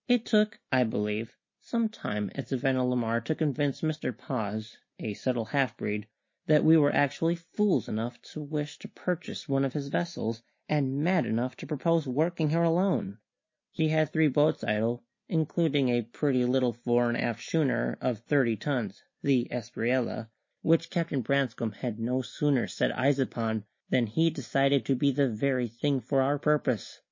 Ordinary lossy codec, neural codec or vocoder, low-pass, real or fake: MP3, 32 kbps; none; 7.2 kHz; real